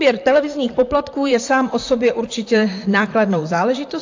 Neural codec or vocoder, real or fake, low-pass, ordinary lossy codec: vocoder, 44.1 kHz, 128 mel bands, Pupu-Vocoder; fake; 7.2 kHz; AAC, 48 kbps